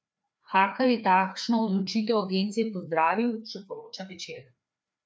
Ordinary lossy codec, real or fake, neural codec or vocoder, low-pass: none; fake; codec, 16 kHz, 2 kbps, FreqCodec, larger model; none